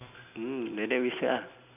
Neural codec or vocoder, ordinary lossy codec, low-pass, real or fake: none; none; 3.6 kHz; real